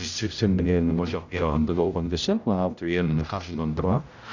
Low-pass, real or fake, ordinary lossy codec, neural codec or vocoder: 7.2 kHz; fake; none; codec, 16 kHz, 0.5 kbps, X-Codec, HuBERT features, trained on general audio